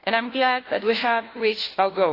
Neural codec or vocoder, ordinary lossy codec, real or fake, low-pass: codec, 16 kHz, 1 kbps, FunCodec, trained on LibriTTS, 50 frames a second; AAC, 24 kbps; fake; 5.4 kHz